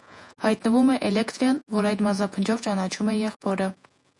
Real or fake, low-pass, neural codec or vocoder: fake; 10.8 kHz; vocoder, 48 kHz, 128 mel bands, Vocos